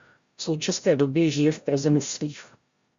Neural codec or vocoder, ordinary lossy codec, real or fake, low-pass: codec, 16 kHz, 0.5 kbps, FreqCodec, larger model; Opus, 64 kbps; fake; 7.2 kHz